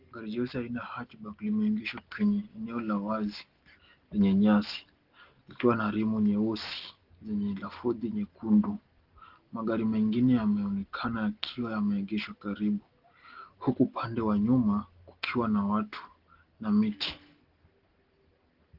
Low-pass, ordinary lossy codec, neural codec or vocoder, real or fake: 5.4 kHz; Opus, 16 kbps; none; real